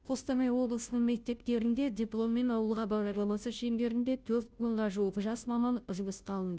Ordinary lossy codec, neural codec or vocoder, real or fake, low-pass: none; codec, 16 kHz, 0.5 kbps, FunCodec, trained on Chinese and English, 25 frames a second; fake; none